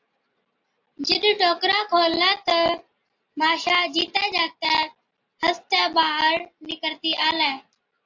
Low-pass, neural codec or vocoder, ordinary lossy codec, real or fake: 7.2 kHz; none; AAC, 48 kbps; real